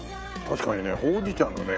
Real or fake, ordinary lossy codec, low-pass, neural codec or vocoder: fake; none; none; codec, 16 kHz, 16 kbps, FreqCodec, smaller model